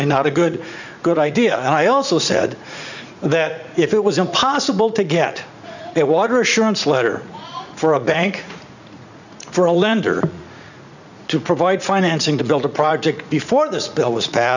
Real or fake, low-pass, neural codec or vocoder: fake; 7.2 kHz; vocoder, 44.1 kHz, 80 mel bands, Vocos